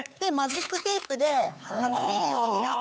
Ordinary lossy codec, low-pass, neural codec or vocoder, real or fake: none; none; codec, 16 kHz, 4 kbps, X-Codec, HuBERT features, trained on LibriSpeech; fake